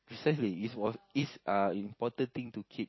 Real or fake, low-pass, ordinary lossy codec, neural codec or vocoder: fake; 7.2 kHz; MP3, 24 kbps; vocoder, 44.1 kHz, 128 mel bands every 256 samples, BigVGAN v2